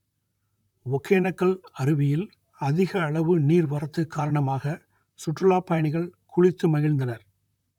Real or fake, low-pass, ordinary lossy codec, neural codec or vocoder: fake; 19.8 kHz; none; vocoder, 44.1 kHz, 128 mel bands, Pupu-Vocoder